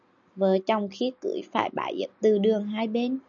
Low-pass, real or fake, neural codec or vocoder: 7.2 kHz; real; none